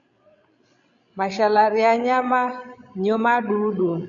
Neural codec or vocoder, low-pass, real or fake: codec, 16 kHz, 16 kbps, FreqCodec, larger model; 7.2 kHz; fake